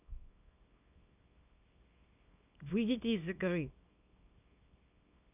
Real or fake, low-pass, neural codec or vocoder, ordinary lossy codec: fake; 3.6 kHz; codec, 24 kHz, 0.9 kbps, WavTokenizer, small release; none